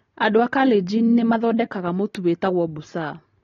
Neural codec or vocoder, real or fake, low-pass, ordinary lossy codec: none; real; 7.2 kHz; AAC, 32 kbps